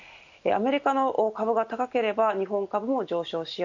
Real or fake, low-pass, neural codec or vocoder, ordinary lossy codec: real; 7.2 kHz; none; none